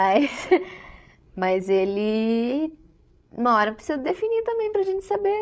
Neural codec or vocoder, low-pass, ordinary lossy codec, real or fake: codec, 16 kHz, 16 kbps, FreqCodec, larger model; none; none; fake